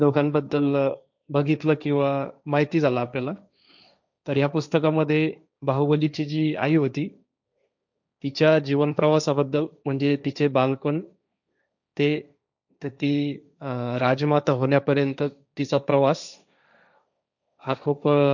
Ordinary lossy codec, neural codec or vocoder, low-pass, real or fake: none; codec, 16 kHz, 1.1 kbps, Voila-Tokenizer; 7.2 kHz; fake